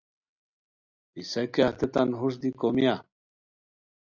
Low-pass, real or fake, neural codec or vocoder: 7.2 kHz; real; none